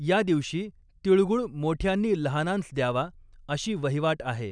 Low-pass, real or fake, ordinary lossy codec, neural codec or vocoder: 9.9 kHz; real; none; none